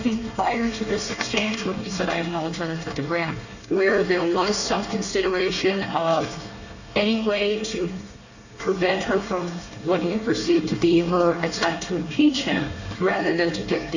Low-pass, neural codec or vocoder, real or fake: 7.2 kHz; codec, 24 kHz, 1 kbps, SNAC; fake